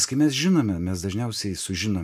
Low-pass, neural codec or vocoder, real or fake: 14.4 kHz; none; real